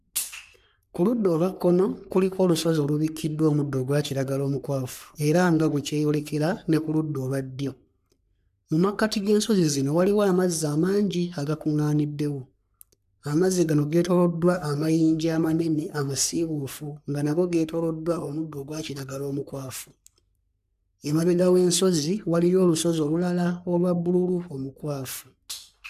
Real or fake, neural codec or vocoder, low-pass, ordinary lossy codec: fake; codec, 44.1 kHz, 3.4 kbps, Pupu-Codec; 14.4 kHz; none